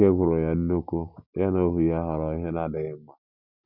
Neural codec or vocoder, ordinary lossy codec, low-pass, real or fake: none; none; 5.4 kHz; real